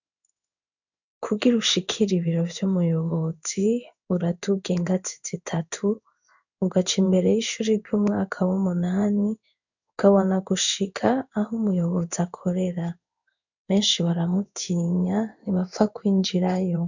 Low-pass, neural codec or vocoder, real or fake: 7.2 kHz; codec, 16 kHz in and 24 kHz out, 1 kbps, XY-Tokenizer; fake